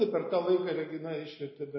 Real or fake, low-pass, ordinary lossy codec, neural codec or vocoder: real; 7.2 kHz; MP3, 24 kbps; none